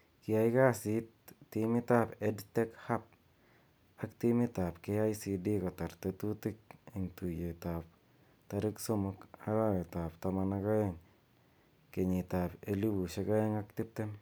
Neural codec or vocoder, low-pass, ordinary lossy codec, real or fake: none; none; none; real